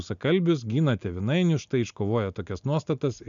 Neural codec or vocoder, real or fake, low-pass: none; real; 7.2 kHz